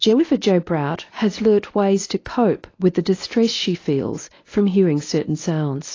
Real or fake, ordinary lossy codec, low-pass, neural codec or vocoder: fake; AAC, 32 kbps; 7.2 kHz; codec, 24 kHz, 0.9 kbps, WavTokenizer, medium speech release version 1